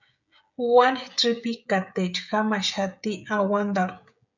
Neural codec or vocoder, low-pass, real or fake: codec, 16 kHz, 16 kbps, FreqCodec, smaller model; 7.2 kHz; fake